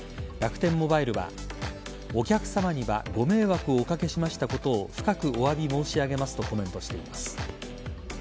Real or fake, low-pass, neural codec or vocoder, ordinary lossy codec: real; none; none; none